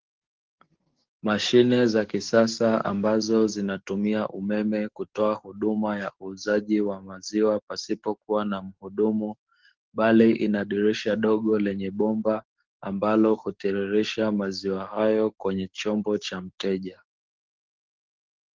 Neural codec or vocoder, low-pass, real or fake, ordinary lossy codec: codec, 44.1 kHz, 7.8 kbps, DAC; 7.2 kHz; fake; Opus, 16 kbps